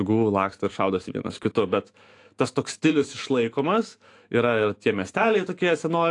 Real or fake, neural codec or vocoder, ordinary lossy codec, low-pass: fake; autoencoder, 48 kHz, 128 numbers a frame, DAC-VAE, trained on Japanese speech; AAC, 48 kbps; 10.8 kHz